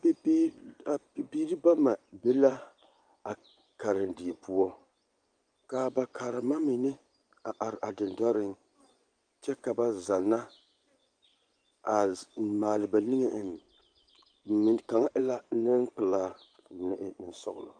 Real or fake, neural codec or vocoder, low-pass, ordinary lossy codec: fake; vocoder, 24 kHz, 100 mel bands, Vocos; 9.9 kHz; Opus, 32 kbps